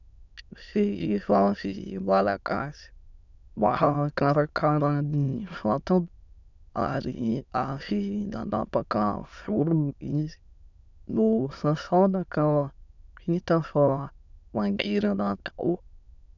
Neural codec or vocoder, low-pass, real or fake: autoencoder, 22.05 kHz, a latent of 192 numbers a frame, VITS, trained on many speakers; 7.2 kHz; fake